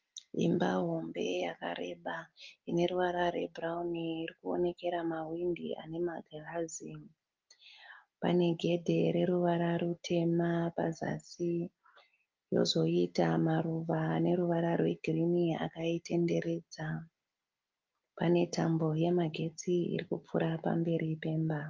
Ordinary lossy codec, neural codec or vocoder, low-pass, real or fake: Opus, 32 kbps; none; 7.2 kHz; real